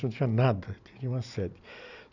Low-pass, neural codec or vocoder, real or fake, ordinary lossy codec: 7.2 kHz; none; real; none